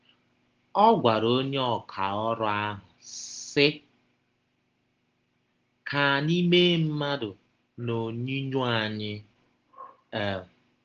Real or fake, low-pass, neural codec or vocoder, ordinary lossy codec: real; 7.2 kHz; none; Opus, 16 kbps